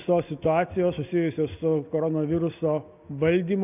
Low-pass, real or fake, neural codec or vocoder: 3.6 kHz; real; none